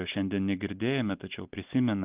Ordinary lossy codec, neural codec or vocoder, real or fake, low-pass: Opus, 24 kbps; none; real; 3.6 kHz